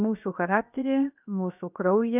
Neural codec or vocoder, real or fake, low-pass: codec, 16 kHz, 0.7 kbps, FocalCodec; fake; 3.6 kHz